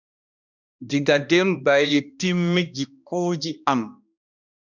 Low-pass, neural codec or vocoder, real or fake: 7.2 kHz; codec, 16 kHz, 1 kbps, X-Codec, HuBERT features, trained on balanced general audio; fake